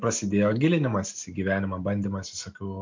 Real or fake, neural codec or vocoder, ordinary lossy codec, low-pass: real; none; MP3, 48 kbps; 7.2 kHz